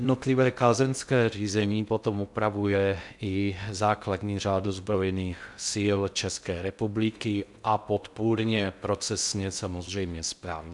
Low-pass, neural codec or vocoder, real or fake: 10.8 kHz; codec, 16 kHz in and 24 kHz out, 0.6 kbps, FocalCodec, streaming, 2048 codes; fake